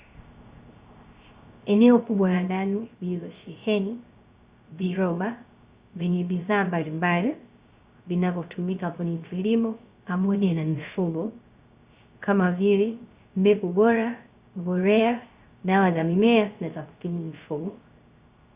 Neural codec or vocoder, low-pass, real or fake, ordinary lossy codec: codec, 16 kHz, 0.3 kbps, FocalCodec; 3.6 kHz; fake; Opus, 64 kbps